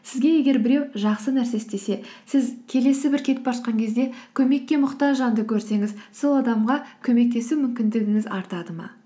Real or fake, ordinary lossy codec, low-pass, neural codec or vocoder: real; none; none; none